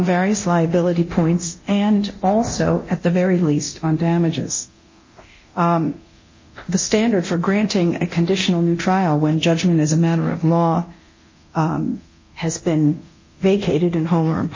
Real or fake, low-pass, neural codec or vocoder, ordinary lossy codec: fake; 7.2 kHz; codec, 24 kHz, 0.9 kbps, DualCodec; MP3, 32 kbps